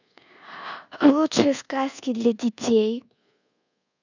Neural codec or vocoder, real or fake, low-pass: codec, 24 kHz, 1.2 kbps, DualCodec; fake; 7.2 kHz